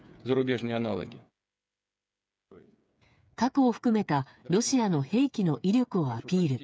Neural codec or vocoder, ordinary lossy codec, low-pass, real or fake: codec, 16 kHz, 8 kbps, FreqCodec, smaller model; none; none; fake